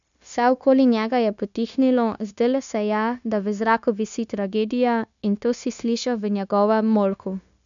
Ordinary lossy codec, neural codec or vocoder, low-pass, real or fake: none; codec, 16 kHz, 0.9 kbps, LongCat-Audio-Codec; 7.2 kHz; fake